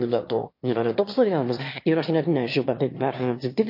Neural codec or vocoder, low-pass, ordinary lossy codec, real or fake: autoencoder, 22.05 kHz, a latent of 192 numbers a frame, VITS, trained on one speaker; 5.4 kHz; MP3, 32 kbps; fake